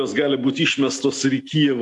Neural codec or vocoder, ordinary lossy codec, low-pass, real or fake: none; AAC, 64 kbps; 10.8 kHz; real